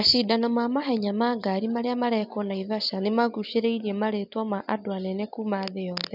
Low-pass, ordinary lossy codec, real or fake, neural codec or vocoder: 5.4 kHz; none; real; none